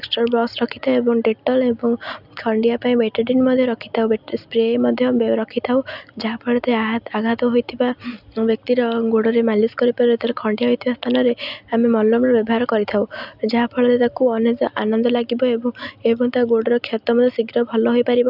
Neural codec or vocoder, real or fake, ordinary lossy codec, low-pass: none; real; none; 5.4 kHz